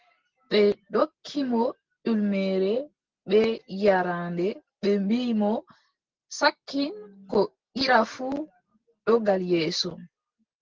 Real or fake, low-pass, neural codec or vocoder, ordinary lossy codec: real; 7.2 kHz; none; Opus, 16 kbps